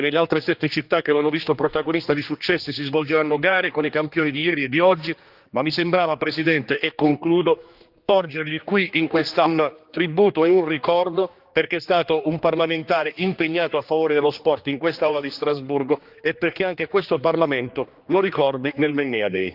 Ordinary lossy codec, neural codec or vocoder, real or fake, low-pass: Opus, 24 kbps; codec, 16 kHz, 2 kbps, X-Codec, HuBERT features, trained on general audio; fake; 5.4 kHz